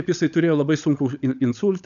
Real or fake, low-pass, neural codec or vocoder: fake; 7.2 kHz; codec, 16 kHz, 16 kbps, FunCodec, trained on LibriTTS, 50 frames a second